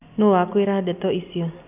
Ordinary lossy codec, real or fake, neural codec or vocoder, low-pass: none; real; none; 3.6 kHz